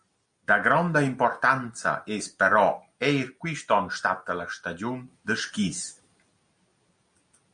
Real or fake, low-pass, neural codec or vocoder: real; 9.9 kHz; none